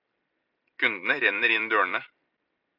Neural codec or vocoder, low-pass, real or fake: vocoder, 44.1 kHz, 128 mel bands every 256 samples, BigVGAN v2; 5.4 kHz; fake